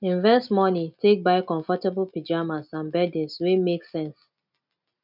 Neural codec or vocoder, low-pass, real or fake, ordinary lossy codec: none; 5.4 kHz; real; none